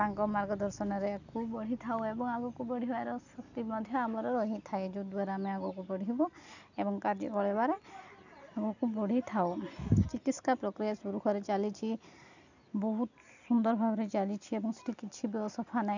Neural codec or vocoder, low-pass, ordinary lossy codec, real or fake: none; 7.2 kHz; none; real